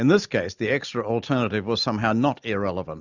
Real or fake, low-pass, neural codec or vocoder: real; 7.2 kHz; none